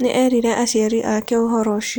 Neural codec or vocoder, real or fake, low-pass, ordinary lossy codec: none; real; none; none